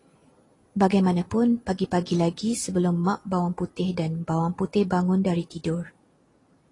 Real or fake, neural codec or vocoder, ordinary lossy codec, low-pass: real; none; AAC, 32 kbps; 10.8 kHz